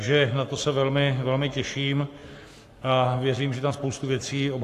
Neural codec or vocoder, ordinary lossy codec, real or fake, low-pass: codec, 44.1 kHz, 7.8 kbps, Pupu-Codec; AAC, 64 kbps; fake; 14.4 kHz